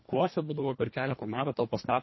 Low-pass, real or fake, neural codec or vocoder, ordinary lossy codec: 7.2 kHz; fake; codec, 24 kHz, 1.5 kbps, HILCodec; MP3, 24 kbps